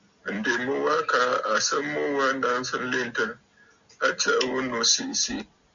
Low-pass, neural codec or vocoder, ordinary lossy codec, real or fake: 7.2 kHz; none; Opus, 64 kbps; real